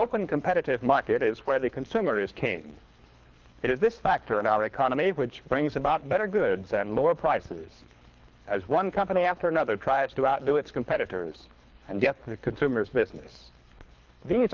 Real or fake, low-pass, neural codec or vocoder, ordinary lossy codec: fake; 7.2 kHz; codec, 24 kHz, 3 kbps, HILCodec; Opus, 24 kbps